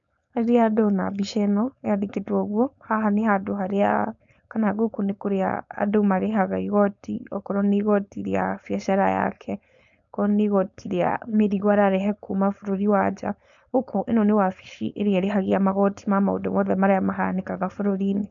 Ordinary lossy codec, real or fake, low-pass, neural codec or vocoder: none; fake; 7.2 kHz; codec, 16 kHz, 4.8 kbps, FACodec